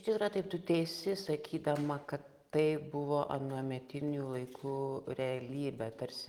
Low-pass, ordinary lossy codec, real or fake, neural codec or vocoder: 19.8 kHz; Opus, 24 kbps; real; none